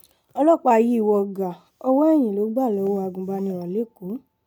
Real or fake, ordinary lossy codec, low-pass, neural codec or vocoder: real; none; 19.8 kHz; none